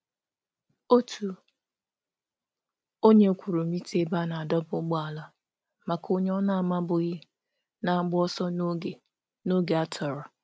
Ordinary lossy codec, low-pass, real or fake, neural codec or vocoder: none; none; real; none